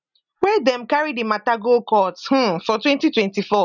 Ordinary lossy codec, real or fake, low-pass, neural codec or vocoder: none; real; 7.2 kHz; none